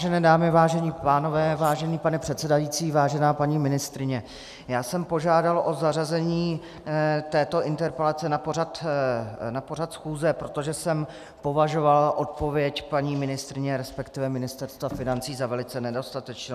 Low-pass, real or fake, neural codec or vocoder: 14.4 kHz; real; none